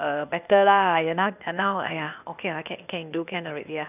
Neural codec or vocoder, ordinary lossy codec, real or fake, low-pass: codec, 16 kHz, 0.8 kbps, ZipCodec; none; fake; 3.6 kHz